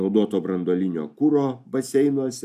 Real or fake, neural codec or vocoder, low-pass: real; none; 14.4 kHz